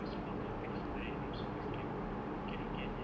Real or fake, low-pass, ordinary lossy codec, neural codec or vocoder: real; none; none; none